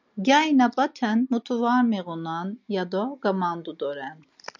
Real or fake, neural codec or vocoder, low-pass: real; none; 7.2 kHz